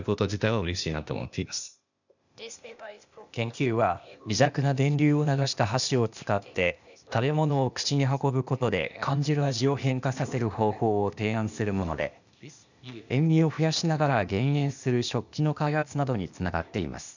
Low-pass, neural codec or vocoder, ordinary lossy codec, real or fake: 7.2 kHz; codec, 16 kHz, 0.8 kbps, ZipCodec; none; fake